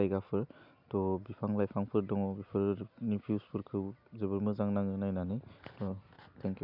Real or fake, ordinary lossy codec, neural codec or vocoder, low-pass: real; none; none; 5.4 kHz